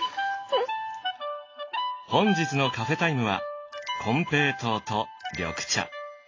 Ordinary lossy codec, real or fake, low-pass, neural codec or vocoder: AAC, 32 kbps; real; 7.2 kHz; none